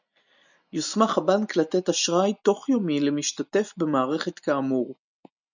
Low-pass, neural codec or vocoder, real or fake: 7.2 kHz; none; real